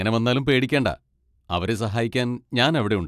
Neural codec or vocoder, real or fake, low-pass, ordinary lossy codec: none; real; 14.4 kHz; AAC, 96 kbps